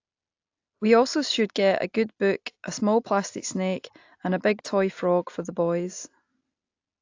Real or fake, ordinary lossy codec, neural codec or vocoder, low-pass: real; AAC, 48 kbps; none; 7.2 kHz